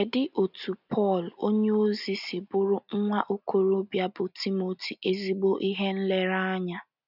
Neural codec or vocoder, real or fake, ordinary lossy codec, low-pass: none; real; none; 5.4 kHz